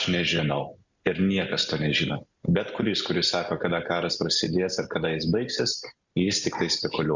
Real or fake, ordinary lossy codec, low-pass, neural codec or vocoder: real; Opus, 64 kbps; 7.2 kHz; none